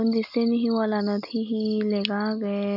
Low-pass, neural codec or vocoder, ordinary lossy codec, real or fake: 5.4 kHz; none; none; real